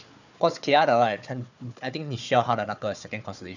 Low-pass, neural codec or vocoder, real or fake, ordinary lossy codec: 7.2 kHz; codec, 16 kHz, 4 kbps, FunCodec, trained on Chinese and English, 50 frames a second; fake; none